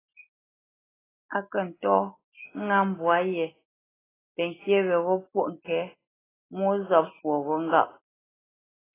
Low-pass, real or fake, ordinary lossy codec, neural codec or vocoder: 3.6 kHz; real; AAC, 16 kbps; none